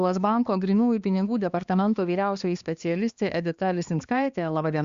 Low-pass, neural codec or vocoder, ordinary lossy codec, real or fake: 7.2 kHz; codec, 16 kHz, 2 kbps, X-Codec, HuBERT features, trained on balanced general audio; Opus, 64 kbps; fake